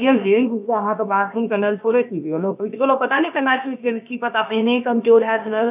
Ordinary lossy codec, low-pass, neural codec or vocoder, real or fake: none; 3.6 kHz; codec, 16 kHz, about 1 kbps, DyCAST, with the encoder's durations; fake